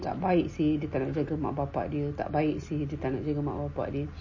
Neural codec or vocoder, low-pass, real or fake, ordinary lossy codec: none; 7.2 kHz; real; MP3, 32 kbps